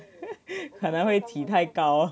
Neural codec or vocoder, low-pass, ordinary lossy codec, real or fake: none; none; none; real